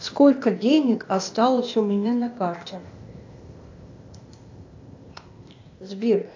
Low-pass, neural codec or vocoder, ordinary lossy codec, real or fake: 7.2 kHz; codec, 16 kHz, 0.8 kbps, ZipCodec; AAC, 48 kbps; fake